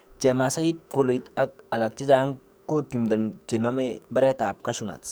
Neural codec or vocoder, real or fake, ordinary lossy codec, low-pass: codec, 44.1 kHz, 2.6 kbps, SNAC; fake; none; none